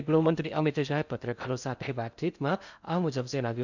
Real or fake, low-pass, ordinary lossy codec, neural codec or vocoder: fake; 7.2 kHz; none; codec, 16 kHz in and 24 kHz out, 0.6 kbps, FocalCodec, streaming, 4096 codes